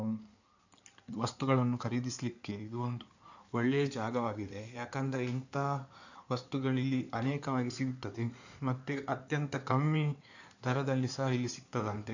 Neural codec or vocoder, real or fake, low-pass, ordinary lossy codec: codec, 16 kHz in and 24 kHz out, 2.2 kbps, FireRedTTS-2 codec; fake; 7.2 kHz; none